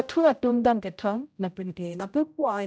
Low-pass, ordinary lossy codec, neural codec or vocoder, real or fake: none; none; codec, 16 kHz, 0.5 kbps, X-Codec, HuBERT features, trained on general audio; fake